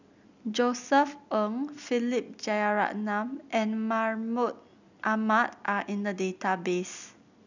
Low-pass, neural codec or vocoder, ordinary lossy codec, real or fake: 7.2 kHz; none; none; real